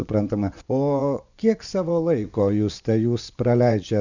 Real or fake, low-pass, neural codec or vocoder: fake; 7.2 kHz; vocoder, 24 kHz, 100 mel bands, Vocos